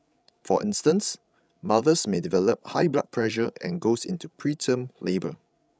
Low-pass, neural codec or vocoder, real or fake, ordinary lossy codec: none; codec, 16 kHz, 16 kbps, FreqCodec, larger model; fake; none